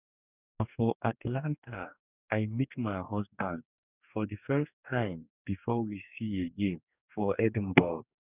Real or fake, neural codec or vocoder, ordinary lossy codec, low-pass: fake; codec, 44.1 kHz, 2.6 kbps, DAC; none; 3.6 kHz